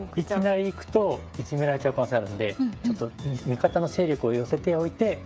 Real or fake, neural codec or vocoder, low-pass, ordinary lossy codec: fake; codec, 16 kHz, 8 kbps, FreqCodec, smaller model; none; none